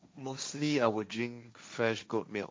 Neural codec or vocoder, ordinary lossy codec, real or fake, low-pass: codec, 16 kHz, 1.1 kbps, Voila-Tokenizer; none; fake; none